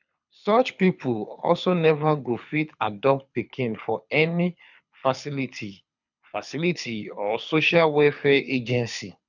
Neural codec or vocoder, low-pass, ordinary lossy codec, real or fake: codec, 24 kHz, 6 kbps, HILCodec; 7.2 kHz; none; fake